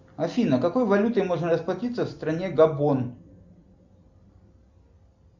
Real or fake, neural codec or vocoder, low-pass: real; none; 7.2 kHz